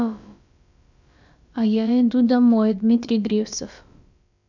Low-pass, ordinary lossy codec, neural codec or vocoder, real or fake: 7.2 kHz; none; codec, 16 kHz, about 1 kbps, DyCAST, with the encoder's durations; fake